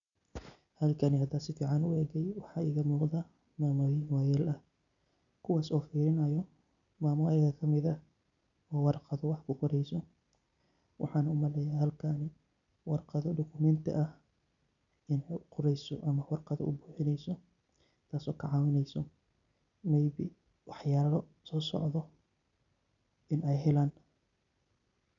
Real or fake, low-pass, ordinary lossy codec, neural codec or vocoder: real; 7.2 kHz; none; none